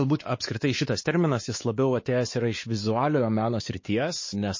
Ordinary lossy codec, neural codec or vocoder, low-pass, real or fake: MP3, 32 kbps; codec, 16 kHz, 4 kbps, X-Codec, WavLM features, trained on Multilingual LibriSpeech; 7.2 kHz; fake